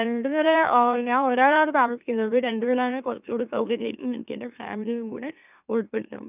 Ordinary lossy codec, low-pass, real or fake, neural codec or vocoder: none; 3.6 kHz; fake; autoencoder, 44.1 kHz, a latent of 192 numbers a frame, MeloTTS